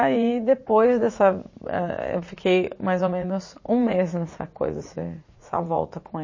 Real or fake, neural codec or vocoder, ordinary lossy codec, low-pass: fake; vocoder, 44.1 kHz, 80 mel bands, Vocos; MP3, 32 kbps; 7.2 kHz